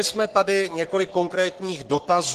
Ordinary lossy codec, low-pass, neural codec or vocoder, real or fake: Opus, 16 kbps; 14.4 kHz; codec, 44.1 kHz, 3.4 kbps, Pupu-Codec; fake